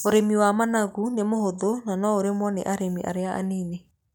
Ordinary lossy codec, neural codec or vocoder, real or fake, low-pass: none; none; real; 19.8 kHz